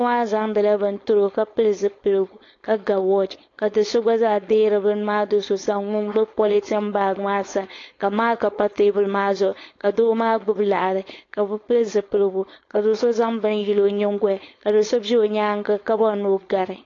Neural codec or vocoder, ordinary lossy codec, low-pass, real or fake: codec, 16 kHz, 4.8 kbps, FACodec; AAC, 32 kbps; 7.2 kHz; fake